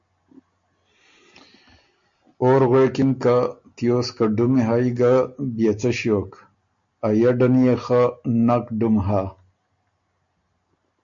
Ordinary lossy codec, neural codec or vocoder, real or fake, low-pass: MP3, 48 kbps; none; real; 7.2 kHz